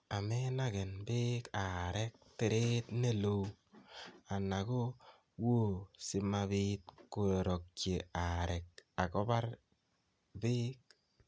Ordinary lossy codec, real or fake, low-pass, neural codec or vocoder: none; real; none; none